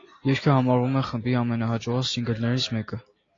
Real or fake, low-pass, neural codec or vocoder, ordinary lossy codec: real; 7.2 kHz; none; AAC, 32 kbps